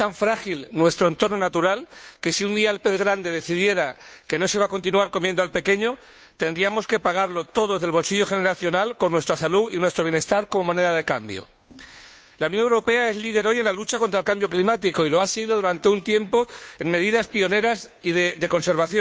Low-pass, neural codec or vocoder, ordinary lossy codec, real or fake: none; codec, 16 kHz, 2 kbps, FunCodec, trained on Chinese and English, 25 frames a second; none; fake